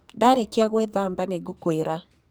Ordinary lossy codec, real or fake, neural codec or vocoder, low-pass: none; fake; codec, 44.1 kHz, 2.6 kbps, SNAC; none